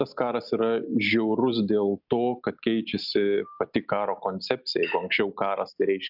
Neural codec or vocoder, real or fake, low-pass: none; real; 5.4 kHz